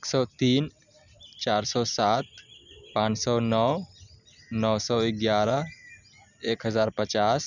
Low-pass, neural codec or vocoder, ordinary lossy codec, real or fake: 7.2 kHz; none; none; real